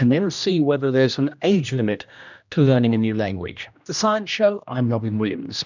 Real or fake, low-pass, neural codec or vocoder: fake; 7.2 kHz; codec, 16 kHz, 1 kbps, X-Codec, HuBERT features, trained on general audio